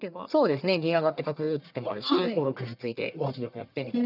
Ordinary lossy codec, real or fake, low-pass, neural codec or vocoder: none; fake; 5.4 kHz; codec, 44.1 kHz, 1.7 kbps, Pupu-Codec